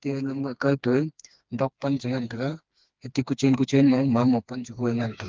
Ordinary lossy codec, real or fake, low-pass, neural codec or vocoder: Opus, 24 kbps; fake; 7.2 kHz; codec, 16 kHz, 2 kbps, FreqCodec, smaller model